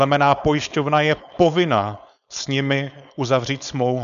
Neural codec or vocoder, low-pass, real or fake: codec, 16 kHz, 4.8 kbps, FACodec; 7.2 kHz; fake